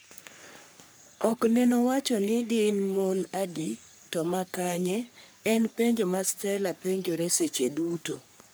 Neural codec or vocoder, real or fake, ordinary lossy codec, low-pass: codec, 44.1 kHz, 3.4 kbps, Pupu-Codec; fake; none; none